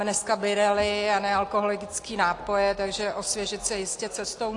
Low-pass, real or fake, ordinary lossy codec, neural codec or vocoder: 10.8 kHz; real; AAC, 48 kbps; none